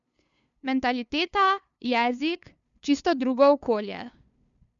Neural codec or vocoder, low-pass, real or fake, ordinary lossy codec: codec, 16 kHz, 2 kbps, FunCodec, trained on LibriTTS, 25 frames a second; 7.2 kHz; fake; none